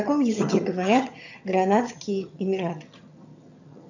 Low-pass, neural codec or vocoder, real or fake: 7.2 kHz; vocoder, 22.05 kHz, 80 mel bands, HiFi-GAN; fake